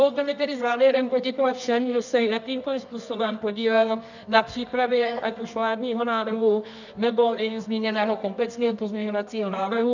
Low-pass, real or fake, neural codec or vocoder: 7.2 kHz; fake; codec, 24 kHz, 0.9 kbps, WavTokenizer, medium music audio release